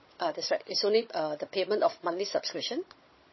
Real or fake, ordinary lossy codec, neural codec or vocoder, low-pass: fake; MP3, 24 kbps; codec, 16 kHz, 16 kbps, FunCodec, trained on Chinese and English, 50 frames a second; 7.2 kHz